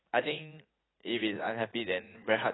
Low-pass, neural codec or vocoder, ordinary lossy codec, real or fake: 7.2 kHz; vocoder, 44.1 kHz, 80 mel bands, Vocos; AAC, 16 kbps; fake